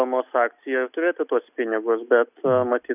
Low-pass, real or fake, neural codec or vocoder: 3.6 kHz; real; none